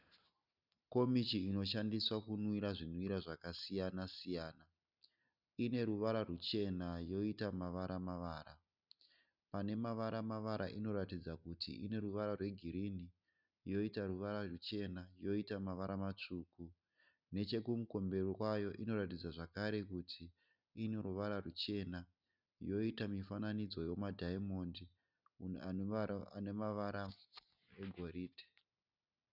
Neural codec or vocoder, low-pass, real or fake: none; 5.4 kHz; real